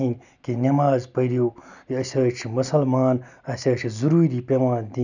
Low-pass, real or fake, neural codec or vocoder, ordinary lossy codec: 7.2 kHz; real; none; none